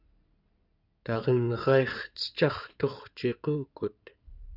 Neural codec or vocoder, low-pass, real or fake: codec, 16 kHz in and 24 kHz out, 2.2 kbps, FireRedTTS-2 codec; 5.4 kHz; fake